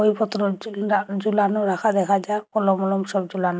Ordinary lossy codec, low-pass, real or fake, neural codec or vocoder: none; none; real; none